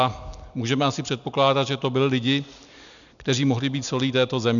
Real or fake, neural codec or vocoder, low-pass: real; none; 7.2 kHz